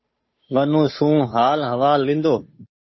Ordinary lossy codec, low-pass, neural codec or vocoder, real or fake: MP3, 24 kbps; 7.2 kHz; codec, 16 kHz, 8 kbps, FunCodec, trained on Chinese and English, 25 frames a second; fake